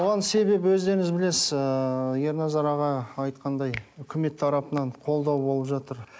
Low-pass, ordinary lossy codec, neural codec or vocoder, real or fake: none; none; none; real